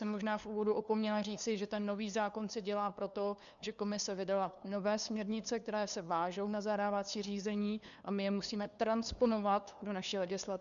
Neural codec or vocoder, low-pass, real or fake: codec, 16 kHz, 2 kbps, FunCodec, trained on LibriTTS, 25 frames a second; 7.2 kHz; fake